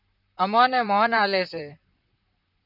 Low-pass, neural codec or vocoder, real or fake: 5.4 kHz; vocoder, 44.1 kHz, 128 mel bands, Pupu-Vocoder; fake